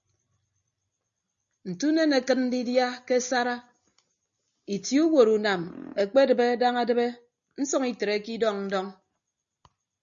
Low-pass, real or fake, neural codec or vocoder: 7.2 kHz; real; none